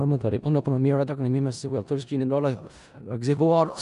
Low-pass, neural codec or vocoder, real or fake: 10.8 kHz; codec, 16 kHz in and 24 kHz out, 0.4 kbps, LongCat-Audio-Codec, four codebook decoder; fake